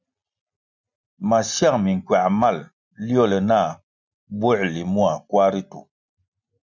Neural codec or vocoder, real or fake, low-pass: none; real; 7.2 kHz